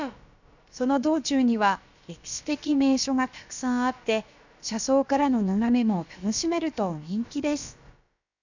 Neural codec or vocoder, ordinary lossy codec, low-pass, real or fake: codec, 16 kHz, about 1 kbps, DyCAST, with the encoder's durations; none; 7.2 kHz; fake